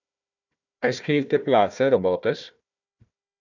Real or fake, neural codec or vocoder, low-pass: fake; codec, 16 kHz, 1 kbps, FunCodec, trained on Chinese and English, 50 frames a second; 7.2 kHz